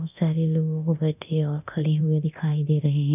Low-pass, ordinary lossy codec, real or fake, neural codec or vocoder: 3.6 kHz; none; fake; codec, 24 kHz, 0.9 kbps, DualCodec